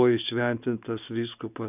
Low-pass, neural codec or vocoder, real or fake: 3.6 kHz; none; real